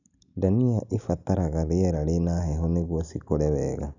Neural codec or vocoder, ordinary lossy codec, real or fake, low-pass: none; none; real; 7.2 kHz